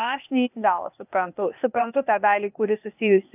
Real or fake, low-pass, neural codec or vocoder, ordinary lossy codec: fake; 3.6 kHz; codec, 16 kHz, 0.8 kbps, ZipCodec; AAC, 32 kbps